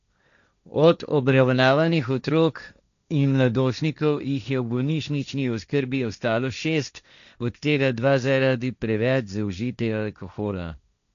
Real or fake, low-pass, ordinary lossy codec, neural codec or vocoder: fake; 7.2 kHz; none; codec, 16 kHz, 1.1 kbps, Voila-Tokenizer